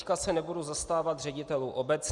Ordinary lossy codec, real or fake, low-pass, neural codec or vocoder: Opus, 64 kbps; real; 10.8 kHz; none